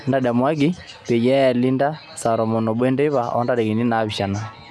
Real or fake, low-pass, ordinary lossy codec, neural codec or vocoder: real; none; none; none